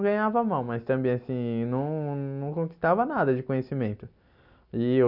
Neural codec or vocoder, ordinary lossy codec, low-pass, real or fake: none; none; 5.4 kHz; real